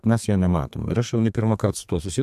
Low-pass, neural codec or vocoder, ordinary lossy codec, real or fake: 14.4 kHz; codec, 32 kHz, 1.9 kbps, SNAC; AAC, 96 kbps; fake